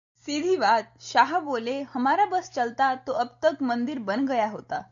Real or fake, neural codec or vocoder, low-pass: real; none; 7.2 kHz